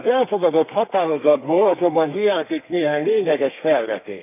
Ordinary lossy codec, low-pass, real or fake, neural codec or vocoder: none; 3.6 kHz; fake; codec, 32 kHz, 1.9 kbps, SNAC